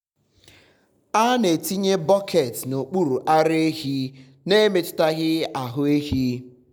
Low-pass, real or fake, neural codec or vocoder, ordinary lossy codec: 19.8 kHz; real; none; none